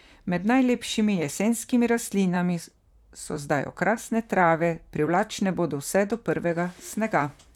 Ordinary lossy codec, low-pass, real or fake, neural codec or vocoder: none; 19.8 kHz; fake; vocoder, 44.1 kHz, 128 mel bands, Pupu-Vocoder